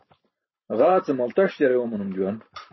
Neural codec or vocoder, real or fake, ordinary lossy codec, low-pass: vocoder, 44.1 kHz, 128 mel bands every 512 samples, BigVGAN v2; fake; MP3, 24 kbps; 7.2 kHz